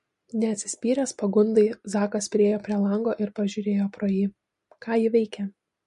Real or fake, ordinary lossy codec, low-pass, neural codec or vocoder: real; MP3, 48 kbps; 10.8 kHz; none